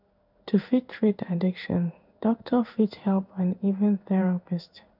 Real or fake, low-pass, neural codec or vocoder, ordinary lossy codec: fake; 5.4 kHz; codec, 16 kHz in and 24 kHz out, 1 kbps, XY-Tokenizer; none